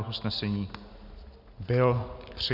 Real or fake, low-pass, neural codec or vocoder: real; 5.4 kHz; none